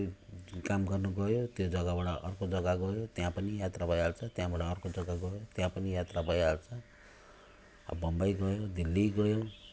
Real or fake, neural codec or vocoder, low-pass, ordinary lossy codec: real; none; none; none